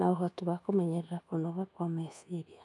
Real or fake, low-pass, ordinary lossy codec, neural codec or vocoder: fake; none; none; codec, 24 kHz, 1.2 kbps, DualCodec